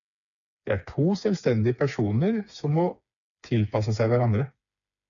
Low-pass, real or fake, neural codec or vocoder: 7.2 kHz; fake; codec, 16 kHz, 4 kbps, FreqCodec, smaller model